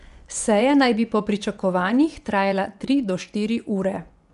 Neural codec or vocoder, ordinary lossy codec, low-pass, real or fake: vocoder, 24 kHz, 100 mel bands, Vocos; none; 10.8 kHz; fake